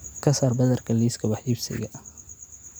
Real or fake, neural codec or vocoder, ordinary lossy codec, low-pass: real; none; none; none